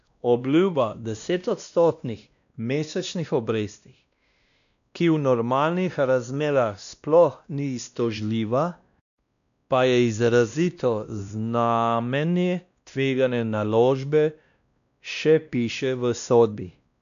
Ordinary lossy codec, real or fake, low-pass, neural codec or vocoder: none; fake; 7.2 kHz; codec, 16 kHz, 1 kbps, X-Codec, WavLM features, trained on Multilingual LibriSpeech